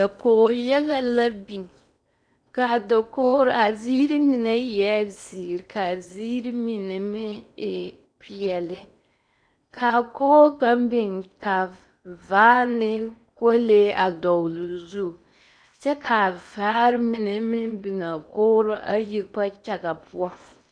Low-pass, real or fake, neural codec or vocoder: 9.9 kHz; fake; codec, 16 kHz in and 24 kHz out, 0.8 kbps, FocalCodec, streaming, 65536 codes